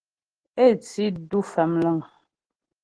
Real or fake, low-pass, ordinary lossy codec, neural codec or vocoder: real; 9.9 kHz; Opus, 16 kbps; none